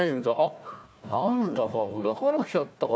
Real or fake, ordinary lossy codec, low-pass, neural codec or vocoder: fake; none; none; codec, 16 kHz, 1 kbps, FunCodec, trained on Chinese and English, 50 frames a second